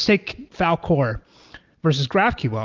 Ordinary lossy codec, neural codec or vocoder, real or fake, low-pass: Opus, 24 kbps; none; real; 7.2 kHz